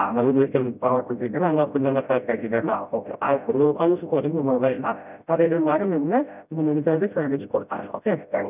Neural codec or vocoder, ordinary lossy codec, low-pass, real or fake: codec, 16 kHz, 0.5 kbps, FreqCodec, smaller model; none; 3.6 kHz; fake